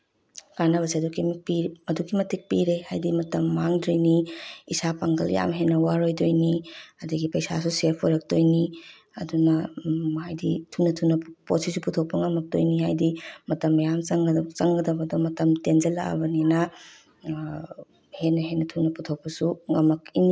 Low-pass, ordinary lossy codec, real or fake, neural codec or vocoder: none; none; real; none